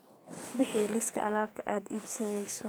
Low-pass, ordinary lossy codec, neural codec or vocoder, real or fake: none; none; codec, 44.1 kHz, 2.6 kbps, SNAC; fake